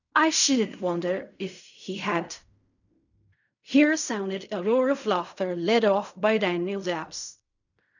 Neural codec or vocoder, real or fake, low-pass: codec, 16 kHz in and 24 kHz out, 0.4 kbps, LongCat-Audio-Codec, fine tuned four codebook decoder; fake; 7.2 kHz